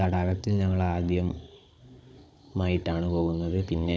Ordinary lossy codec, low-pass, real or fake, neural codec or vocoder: none; none; fake; codec, 16 kHz, 16 kbps, FunCodec, trained on Chinese and English, 50 frames a second